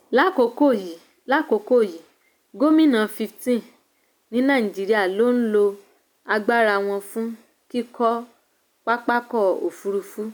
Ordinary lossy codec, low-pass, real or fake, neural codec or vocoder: none; none; real; none